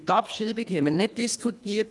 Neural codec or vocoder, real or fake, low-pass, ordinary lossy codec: codec, 24 kHz, 1.5 kbps, HILCodec; fake; none; none